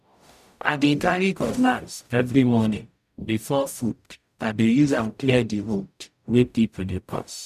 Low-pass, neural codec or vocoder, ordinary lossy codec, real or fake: 14.4 kHz; codec, 44.1 kHz, 0.9 kbps, DAC; none; fake